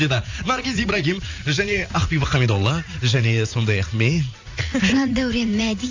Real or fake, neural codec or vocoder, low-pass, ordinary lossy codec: fake; vocoder, 44.1 kHz, 80 mel bands, Vocos; 7.2 kHz; AAC, 48 kbps